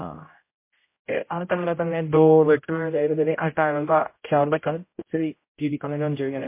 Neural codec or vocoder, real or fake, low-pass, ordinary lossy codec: codec, 16 kHz, 0.5 kbps, X-Codec, HuBERT features, trained on general audio; fake; 3.6 kHz; MP3, 24 kbps